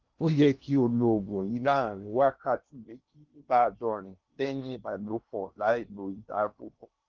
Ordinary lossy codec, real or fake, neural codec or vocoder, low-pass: Opus, 24 kbps; fake; codec, 16 kHz in and 24 kHz out, 0.8 kbps, FocalCodec, streaming, 65536 codes; 7.2 kHz